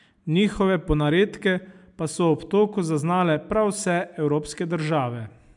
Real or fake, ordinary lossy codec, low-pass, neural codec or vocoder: real; MP3, 96 kbps; 10.8 kHz; none